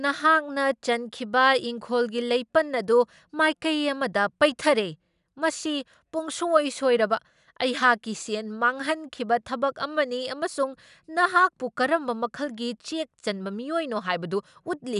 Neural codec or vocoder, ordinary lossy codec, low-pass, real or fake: none; none; 10.8 kHz; real